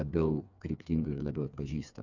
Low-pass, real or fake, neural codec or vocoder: 7.2 kHz; fake; codec, 16 kHz, 4 kbps, FreqCodec, smaller model